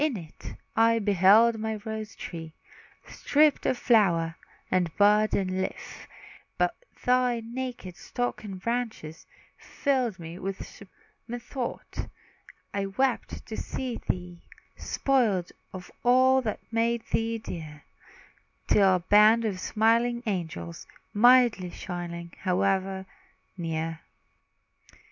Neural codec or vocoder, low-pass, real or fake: none; 7.2 kHz; real